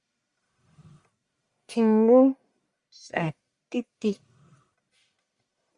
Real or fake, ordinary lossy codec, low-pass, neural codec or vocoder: fake; Opus, 64 kbps; 10.8 kHz; codec, 44.1 kHz, 1.7 kbps, Pupu-Codec